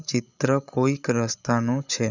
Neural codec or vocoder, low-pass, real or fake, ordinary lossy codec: codec, 16 kHz, 8 kbps, FreqCodec, larger model; 7.2 kHz; fake; none